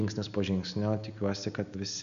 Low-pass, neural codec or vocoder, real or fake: 7.2 kHz; none; real